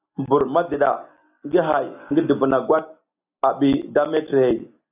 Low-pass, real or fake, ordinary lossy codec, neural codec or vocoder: 3.6 kHz; real; AAC, 32 kbps; none